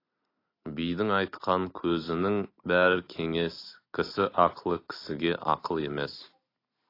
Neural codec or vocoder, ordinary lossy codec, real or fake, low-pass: none; AAC, 32 kbps; real; 5.4 kHz